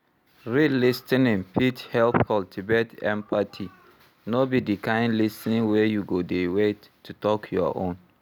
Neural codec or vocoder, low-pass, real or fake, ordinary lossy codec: none; none; real; none